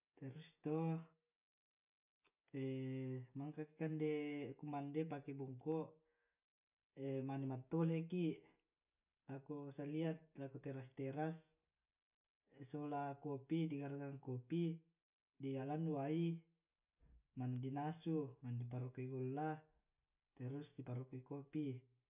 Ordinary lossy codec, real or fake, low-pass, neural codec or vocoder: none; real; 3.6 kHz; none